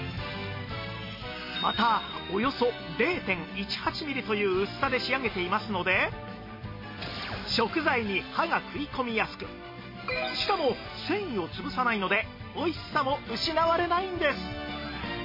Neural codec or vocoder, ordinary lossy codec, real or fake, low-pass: none; MP3, 24 kbps; real; 5.4 kHz